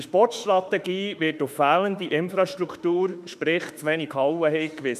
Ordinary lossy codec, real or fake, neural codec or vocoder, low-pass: none; fake; autoencoder, 48 kHz, 32 numbers a frame, DAC-VAE, trained on Japanese speech; 14.4 kHz